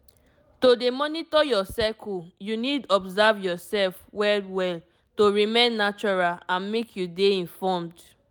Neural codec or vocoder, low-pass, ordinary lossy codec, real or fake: none; none; none; real